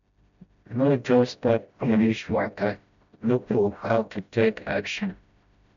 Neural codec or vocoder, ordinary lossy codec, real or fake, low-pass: codec, 16 kHz, 0.5 kbps, FreqCodec, smaller model; MP3, 64 kbps; fake; 7.2 kHz